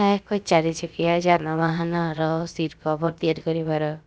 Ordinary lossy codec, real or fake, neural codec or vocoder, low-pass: none; fake; codec, 16 kHz, about 1 kbps, DyCAST, with the encoder's durations; none